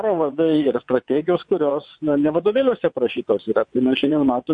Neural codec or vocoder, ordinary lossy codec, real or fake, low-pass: vocoder, 22.05 kHz, 80 mel bands, WaveNeXt; MP3, 48 kbps; fake; 9.9 kHz